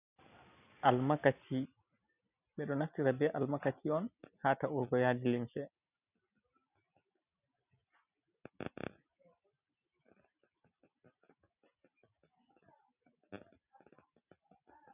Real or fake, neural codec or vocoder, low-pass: real; none; 3.6 kHz